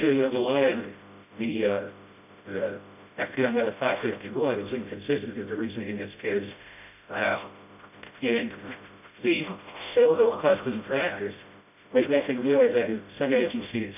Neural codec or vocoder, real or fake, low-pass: codec, 16 kHz, 0.5 kbps, FreqCodec, smaller model; fake; 3.6 kHz